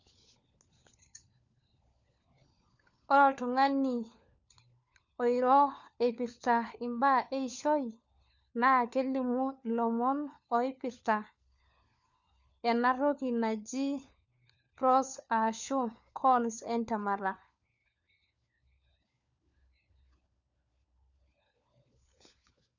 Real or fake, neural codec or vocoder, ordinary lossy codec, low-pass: fake; codec, 16 kHz, 4 kbps, FunCodec, trained on LibriTTS, 50 frames a second; none; 7.2 kHz